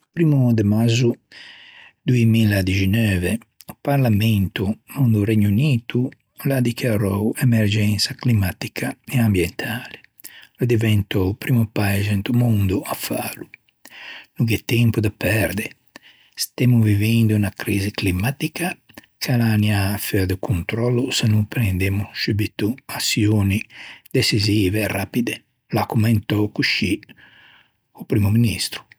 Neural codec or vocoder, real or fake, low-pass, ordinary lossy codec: none; real; none; none